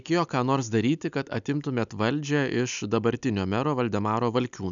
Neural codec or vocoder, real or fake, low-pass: none; real; 7.2 kHz